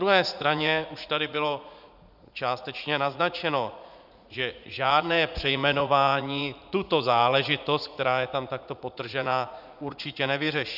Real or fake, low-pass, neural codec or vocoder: fake; 5.4 kHz; vocoder, 44.1 kHz, 80 mel bands, Vocos